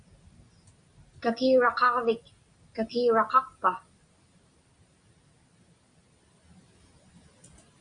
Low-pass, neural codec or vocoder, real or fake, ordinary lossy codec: 9.9 kHz; none; real; Opus, 64 kbps